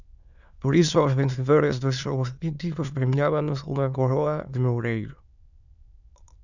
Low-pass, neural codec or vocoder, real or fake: 7.2 kHz; autoencoder, 22.05 kHz, a latent of 192 numbers a frame, VITS, trained on many speakers; fake